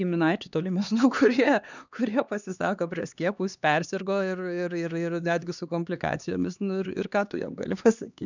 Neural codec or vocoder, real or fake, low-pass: codec, 16 kHz, 2 kbps, X-Codec, HuBERT features, trained on LibriSpeech; fake; 7.2 kHz